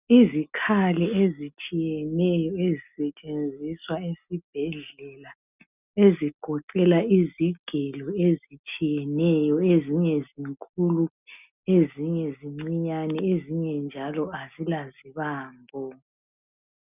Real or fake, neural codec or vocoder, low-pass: real; none; 3.6 kHz